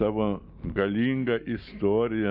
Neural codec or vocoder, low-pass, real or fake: none; 5.4 kHz; real